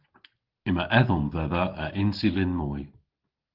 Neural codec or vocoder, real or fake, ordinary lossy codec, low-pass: none; real; Opus, 16 kbps; 5.4 kHz